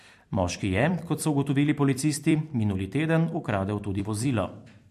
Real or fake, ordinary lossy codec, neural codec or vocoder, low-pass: fake; MP3, 64 kbps; vocoder, 44.1 kHz, 128 mel bands every 256 samples, BigVGAN v2; 14.4 kHz